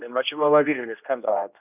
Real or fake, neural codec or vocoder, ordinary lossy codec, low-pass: fake; codec, 16 kHz, 1 kbps, X-Codec, HuBERT features, trained on general audio; none; 3.6 kHz